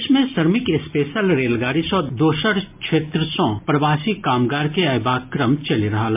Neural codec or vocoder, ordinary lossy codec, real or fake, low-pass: none; MP3, 32 kbps; real; 3.6 kHz